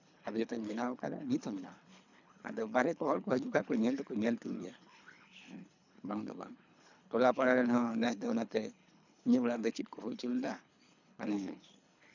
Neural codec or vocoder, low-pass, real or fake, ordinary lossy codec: codec, 24 kHz, 3 kbps, HILCodec; 7.2 kHz; fake; none